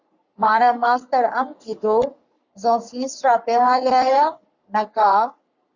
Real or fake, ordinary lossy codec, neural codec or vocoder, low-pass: fake; Opus, 64 kbps; codec, 44.1 kHz, 3.4 kbps, Pupu-Codec; 7.2 kHz